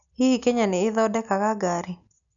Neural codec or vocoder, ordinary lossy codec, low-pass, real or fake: none; none; 7.2 kHz; real